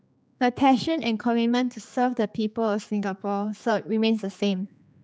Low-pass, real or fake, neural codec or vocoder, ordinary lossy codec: none; fake; codec, 16 kHz, 4 kbps, X-Codec, HuBERT features, trained on general audio; none